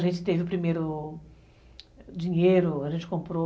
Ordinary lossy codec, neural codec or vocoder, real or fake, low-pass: none; none; real; none